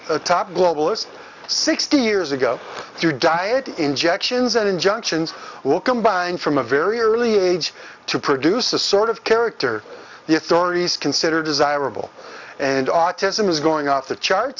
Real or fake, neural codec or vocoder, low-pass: real; none; 7.2 kHz